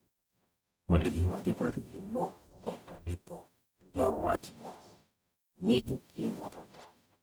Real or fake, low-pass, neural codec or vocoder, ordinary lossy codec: fake; none; codec, 44.1 kHz, 0.9 kbps, DAC; none